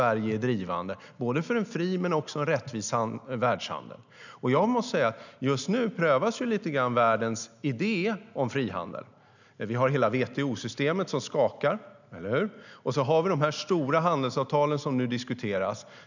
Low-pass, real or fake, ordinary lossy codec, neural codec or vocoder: 7.2 kHz; real; none; none